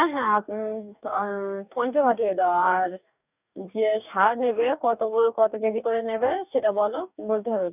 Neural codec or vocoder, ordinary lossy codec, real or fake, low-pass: codec, 44.1 kHz, 2.6 kbps, DAC; none; fake; 3.6 kHz